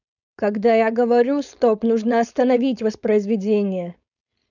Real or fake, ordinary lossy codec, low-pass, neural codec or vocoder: fake; none; 7.2 kHz; codec, 16 kHz, 4.8 kbps, FACodec